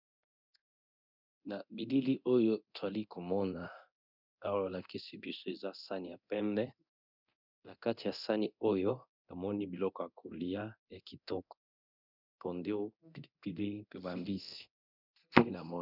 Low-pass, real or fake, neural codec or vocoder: 5.4 kHz; fake; codec, 24 kHz, 0.9 kbps, DualCodec